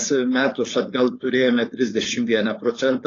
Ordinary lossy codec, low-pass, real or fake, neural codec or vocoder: AAC, 32 kbps; 7.2 kHz; fake; codec, 16 kHz, 4.8 kbps, FACodec